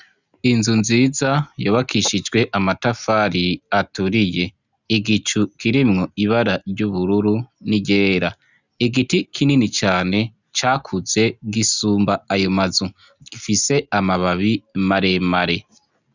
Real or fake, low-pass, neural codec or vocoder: real; 7.2 kHz; none